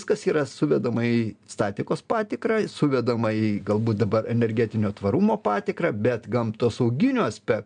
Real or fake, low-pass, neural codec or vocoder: real; 9.9 kHz; none